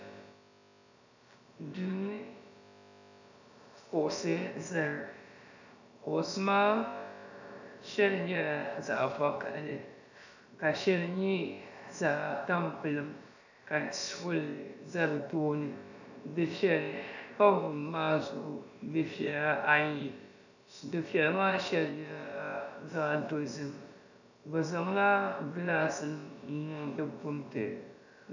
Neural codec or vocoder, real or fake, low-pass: codec, 16 kHz, about 1 kbps, DyCAST, with the encoder's durations; fake; 7.2 kHz